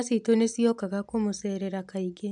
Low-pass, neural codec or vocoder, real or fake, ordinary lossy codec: 10.8 kHz; none; real; none